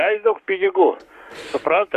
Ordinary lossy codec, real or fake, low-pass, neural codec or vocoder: MP3, 96 kbps; fake; 14.4 kHz; codec, 44.1 kHz, 7.8 kbps, Pupu-Codec